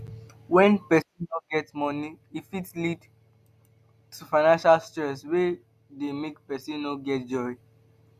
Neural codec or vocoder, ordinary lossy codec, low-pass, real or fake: none; none; 14.4 kHz; real